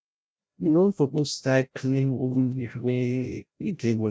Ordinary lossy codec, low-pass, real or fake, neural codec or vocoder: none; none; fake; codec, 16 kHz, 0.5 kbps, FreqCodec, larger model